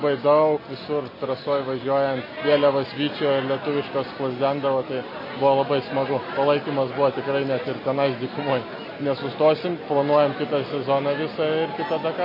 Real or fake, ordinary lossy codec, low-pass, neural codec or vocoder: real; MP3, 24 kbps; 5.4 kHz; none